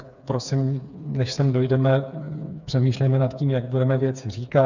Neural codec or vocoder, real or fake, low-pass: codec, 16 kHz, 4 kbps, FreqCodec, smaller model; fake; 7.2 kHz